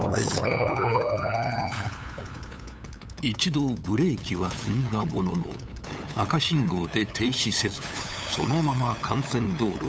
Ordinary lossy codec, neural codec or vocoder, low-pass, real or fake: none; codec, 16 kHz, 8 kbps, FunCodec, trained on LibriTTS, 25 frames a second; none; fake